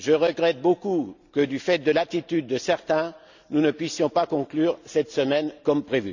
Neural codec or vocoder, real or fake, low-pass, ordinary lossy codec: none; real; 7.2 kHz; none